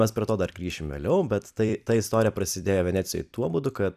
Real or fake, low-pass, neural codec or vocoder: fake; 14.4 kHz; vocoder, 44.1 kHz, 128 mel bands every 256 samples, BigVGAN v2